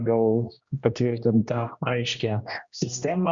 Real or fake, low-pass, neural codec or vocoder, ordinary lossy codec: fake; 7.2 kHz; codec, 16 kHz, 1 kbps, X-Codec, HuBERT features, trained on general audio; Opus, 64 kbps